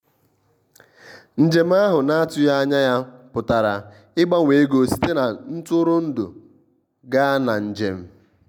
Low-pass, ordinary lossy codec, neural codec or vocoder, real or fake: 19.8 kHz; none; none; real